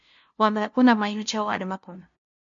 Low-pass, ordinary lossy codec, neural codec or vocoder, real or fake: 7.2 kHz; MP3, 48 kbps; codec, 16 kHz, 0.5 kbps, FunCodec, trained on LibriTTS, 25 frames a second; fake